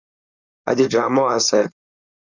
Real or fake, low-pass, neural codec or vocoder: fake; 7.2 kHz; codec, 16 kHz, 4.8 kbps, FACodec